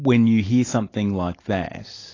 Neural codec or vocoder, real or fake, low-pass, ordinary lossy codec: none; real; 7.2 kHz; AAC, 32 kbps